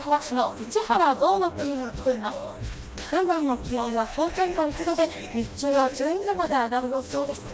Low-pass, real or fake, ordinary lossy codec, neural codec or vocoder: none; fake; none; codec, 16 kHz, 1 kbps, FreqCodec, smaller model